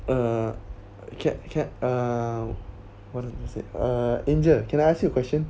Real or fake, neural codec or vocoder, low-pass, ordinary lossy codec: real; none; none; none